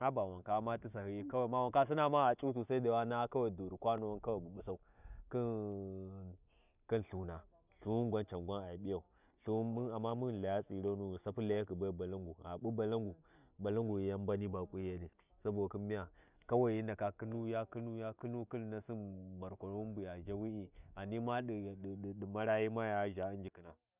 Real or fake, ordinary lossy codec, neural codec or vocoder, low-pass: real; none; none; 3.6 kHz